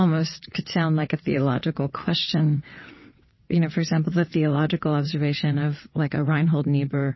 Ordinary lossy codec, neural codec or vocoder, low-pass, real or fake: MP3, 24 kbps; vocoder, 22.05 kHz, 80 mel bands, WaveNeXt; 7.2 kHz; fake